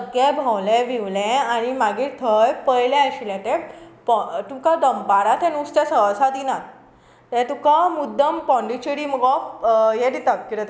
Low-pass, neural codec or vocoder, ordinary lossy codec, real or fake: none; none; none; real